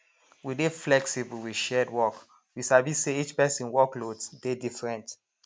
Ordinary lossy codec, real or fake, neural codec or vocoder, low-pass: none; real; none; none